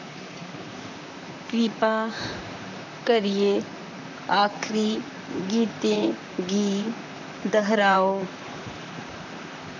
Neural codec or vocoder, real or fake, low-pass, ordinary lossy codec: vocoder, 44.1 kHz, 128 mel bands, Pupu-Vocoder; fake; 7.2 kHz; none